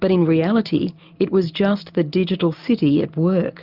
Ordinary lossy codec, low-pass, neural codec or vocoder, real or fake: Opus, 24 kbps; 5.4 kHz; none; real